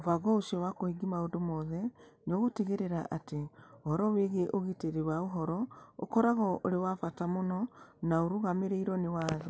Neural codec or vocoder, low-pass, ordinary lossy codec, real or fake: none; none; none; real